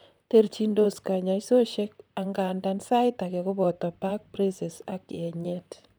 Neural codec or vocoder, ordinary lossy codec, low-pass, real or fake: vocoder, 44.1 kHz, 128 mel bands, Pupu-Vocoder; none; none; fake